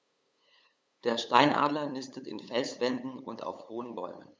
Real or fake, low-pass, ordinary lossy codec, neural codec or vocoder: fake; none; none; codec, 16 kHz, 8 kbps, FunCodec, trained on LibriTTS, 25 frames a second